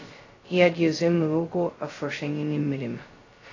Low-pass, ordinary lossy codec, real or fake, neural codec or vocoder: 7.2 kHz; AAC, 32 kbps; fake; codec, 16 kHz, 0.2 kbps, FocalCodec